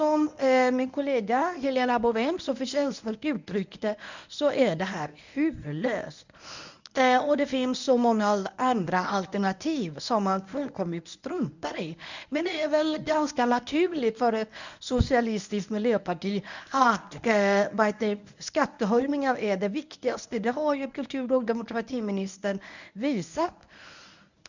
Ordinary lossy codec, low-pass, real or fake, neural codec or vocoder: none; 7.2 kHz; fake; codec, 24 kHz, 0.9 kbps, WavTokenizer, medium speech release version 1